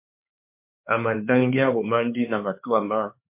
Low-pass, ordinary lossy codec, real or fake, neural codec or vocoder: 3.6 kHz; MP3, 32 kbps; fake; codec, 16 kHz, 4 kbps, X-Codec, HuBERT features, trained on LibriSpeech